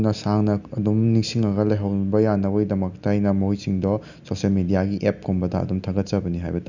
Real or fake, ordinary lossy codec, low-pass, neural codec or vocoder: real; none; 7.2 kHz; none